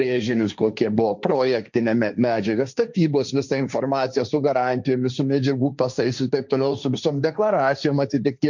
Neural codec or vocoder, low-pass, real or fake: codec, 16 kHz, 1.1 kbps, Voila-Tokenizer; 7.2 kHz; fake